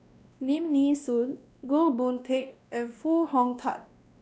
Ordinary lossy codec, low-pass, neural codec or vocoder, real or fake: none; none; codec, 16 kHz, 1 kbps, X-Codec, WavLM features, trained on Multilingual LibriSpeech; fake